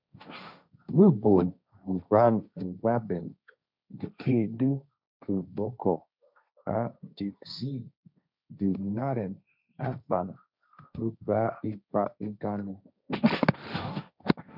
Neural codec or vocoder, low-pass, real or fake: codec, 16 kHz, 1.1 kbps, Voila-Tokenizer; 5.4 kHz; fake